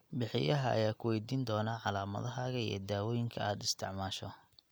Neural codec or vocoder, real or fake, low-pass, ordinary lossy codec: none; real; none; none